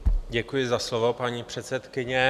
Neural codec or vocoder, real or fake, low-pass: none; real; 14.4 kHz